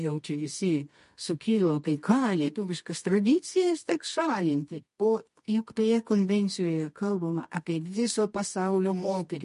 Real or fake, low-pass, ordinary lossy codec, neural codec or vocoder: fake; 10.8 kHz; MP3, 48 kbps; codec, 24 kHz, 0.9 kbps, WavTokenizer, medium music audio release